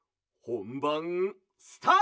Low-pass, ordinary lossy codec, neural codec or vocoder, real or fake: none; none; none; real